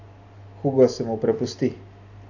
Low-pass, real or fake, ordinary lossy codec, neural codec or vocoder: 7.2 kHz; real; none; none